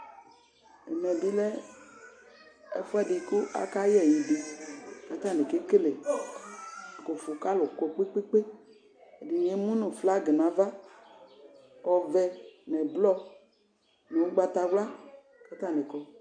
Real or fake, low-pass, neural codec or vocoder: real; 9.9 kHz; none